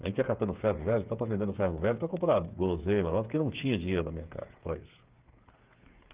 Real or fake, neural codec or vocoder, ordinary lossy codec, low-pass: fake; codec, 16 kHz, 8 kbps, FreqCodec, smaller model; Opus, 24 kbps; 3.6 kHz